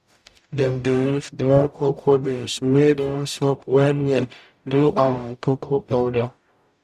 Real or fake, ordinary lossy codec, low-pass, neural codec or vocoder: fake; none; 14.4 kHz; codec, 44.1 kHz, 0.9 kbps, DAC